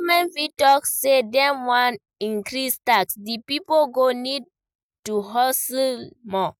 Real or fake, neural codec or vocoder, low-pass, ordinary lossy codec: real; none; none; none